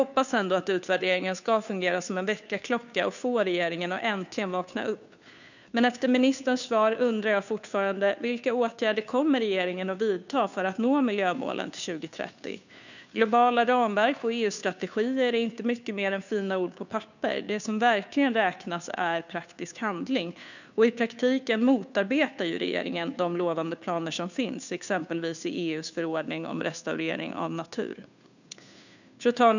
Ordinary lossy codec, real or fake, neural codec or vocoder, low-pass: none; fake; codec, 16 kHz, 2 kbps, FunCodec, trained on Chinese and English, 25 frames a second; 7.2 kHz